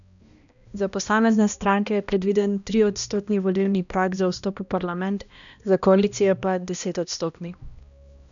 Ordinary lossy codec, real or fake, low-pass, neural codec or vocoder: none; fake; 7.2 kHz; codec, 16 kHz, 1 kbps, X-Codec, HuBERT features, trained on balanced general audio